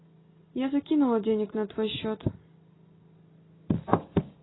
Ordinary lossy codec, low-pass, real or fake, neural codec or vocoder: AAC, 16 kbps; 7.2 kHz; real; none